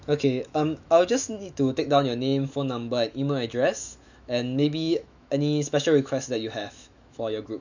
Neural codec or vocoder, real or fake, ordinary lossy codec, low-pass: autoencoder, 48 kHz, 128 numbers a frame, DAC-VAE, trained on Japanese speech; fake; none; 7.2 kHz